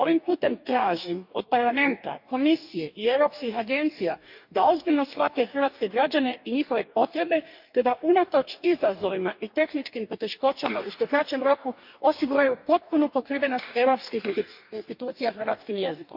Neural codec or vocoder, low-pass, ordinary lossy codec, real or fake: codec, 44.1 kHz, 2.6 kbps, DAC; 5.4 kHz; none; fake